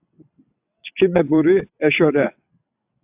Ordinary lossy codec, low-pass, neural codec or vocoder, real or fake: AAC, 32 kbps; 3.6 kHz; vocoder, 22.05 kHz, 80 mel bands, WaveNeXt; fake